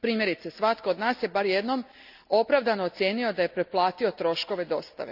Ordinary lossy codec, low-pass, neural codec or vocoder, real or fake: none; 5.4 kHz; none; real